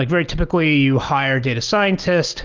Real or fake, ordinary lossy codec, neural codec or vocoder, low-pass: real; Opus, 24 kbps; none; 7.2 kHz